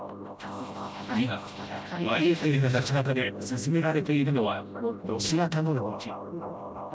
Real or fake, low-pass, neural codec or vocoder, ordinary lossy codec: fake; none; codec, 16 kHz, 0.5 kbps, FreqCodec, smaller model; none